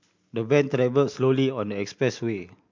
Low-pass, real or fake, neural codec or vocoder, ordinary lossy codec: 7.2 kHz; real; none; MP3, 64 kbps